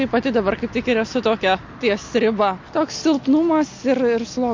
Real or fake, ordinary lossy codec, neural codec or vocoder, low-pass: real; MP3, 48 kbps; none; 7.2 kHz